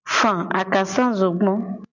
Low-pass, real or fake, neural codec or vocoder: 7.2 kHz; real; none